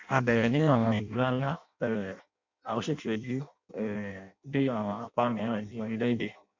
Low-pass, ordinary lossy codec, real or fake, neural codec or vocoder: 7.2 kHz; MP3, 64 kbps; fake; codec, 16 kHz in and 24 kHz out, 0.6 kbps, FireRedTTS-2 codec